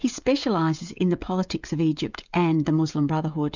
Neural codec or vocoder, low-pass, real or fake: codec, 16 kHz, 16 kbps, FreqCodec, smaller model; 7.2 kHz; fake